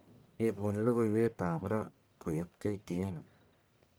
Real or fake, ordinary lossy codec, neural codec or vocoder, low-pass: fake; none; codec, 44.1 kHz, 1.7 kbps, Pupu-Codec; none